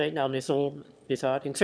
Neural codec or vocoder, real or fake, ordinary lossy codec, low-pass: autoencoder, 22.05 kHz, a latent of 192 numbers a frame, VITS, trained on one speaker; fake; none; none